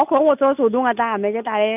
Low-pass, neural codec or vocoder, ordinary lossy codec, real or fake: 3.6 kHz; none; none; real